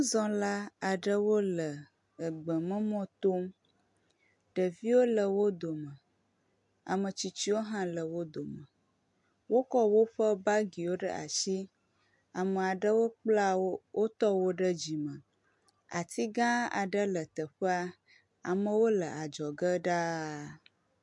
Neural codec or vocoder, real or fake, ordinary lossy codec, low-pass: none; real; MP3, 64 kbps; 10.8 kHz